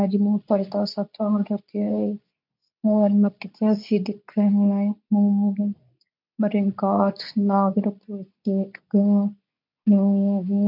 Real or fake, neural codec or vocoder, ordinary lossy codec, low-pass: fake; codec, 24 kHz, 0.9 kbps, WavTokenizer, medium speech release version 2; none; 5.4 kHz